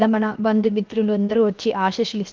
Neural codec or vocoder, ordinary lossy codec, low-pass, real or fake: codec, 16 kHz, 0.7 kbps, FocalCodec; Opus, 32 kbps; 7.2 kHz; fake